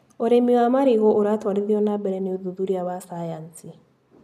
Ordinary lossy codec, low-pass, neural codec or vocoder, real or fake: none; 14.4 kHz; none; real